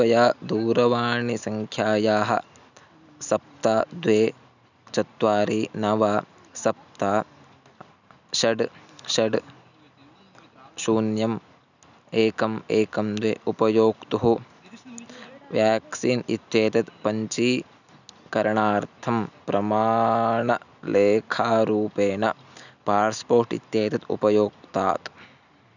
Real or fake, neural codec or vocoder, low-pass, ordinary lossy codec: real; none; 7.2 kHz; none